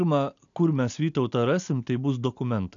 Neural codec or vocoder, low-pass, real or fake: none; 7.2 kHz; real